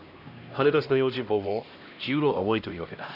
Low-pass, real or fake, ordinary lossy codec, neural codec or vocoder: 5.4 kHz; fake; none; codec, 16 kHz, 1 kbps, X-Codec, HuBERT features, trained on LibriSpeech